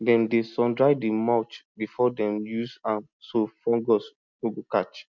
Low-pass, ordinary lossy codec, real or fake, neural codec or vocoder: 7.2 kHz; none; real; none